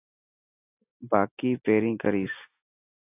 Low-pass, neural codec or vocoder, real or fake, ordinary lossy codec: 3.6 kHz; none; real; AAC, 24 kbps